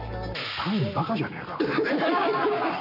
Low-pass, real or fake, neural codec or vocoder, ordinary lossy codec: 5.4 kHz; fake; codec, 44.1 kHz, 7.8 kbps, Pupu-Codec; none